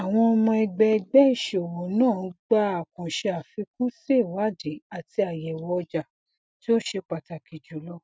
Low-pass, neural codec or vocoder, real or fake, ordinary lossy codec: none; none; real; none